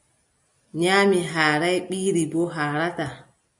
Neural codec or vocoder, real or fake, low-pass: none; real; 10.8 kHz